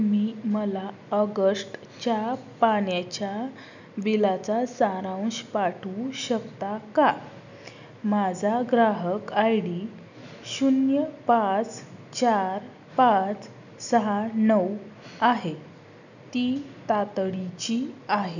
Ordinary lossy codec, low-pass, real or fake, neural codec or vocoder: none; 7.2 kHz; real; none